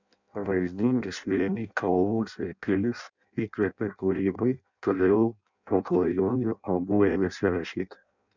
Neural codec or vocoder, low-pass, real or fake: codec, 16 kHz in and 24 kHz out, 0.6 kbps, FireRedTTS-2 codec; 7.2 kHz; fake